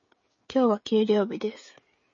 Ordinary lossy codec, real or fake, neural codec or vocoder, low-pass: MP3, 32 kbps; fake; codec, 16 kHz, 16 kbps, FreqCodec, smaller model; 7.2 kHz